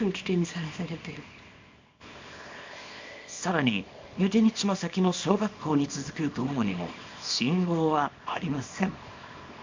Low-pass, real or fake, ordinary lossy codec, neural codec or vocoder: 7.2 kHz; fake; MP3, 64 kbps; codec, 24 kHz, 0.9 kbps, WavTokenizer, small release